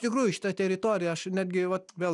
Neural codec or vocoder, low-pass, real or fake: none; 10.8 kHz; real